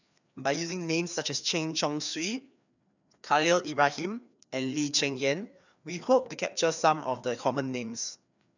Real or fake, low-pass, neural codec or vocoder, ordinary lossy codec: fake; 7.2 kHz; codec, 16 kHz, 2 kbps, FreqCodec, larger model; none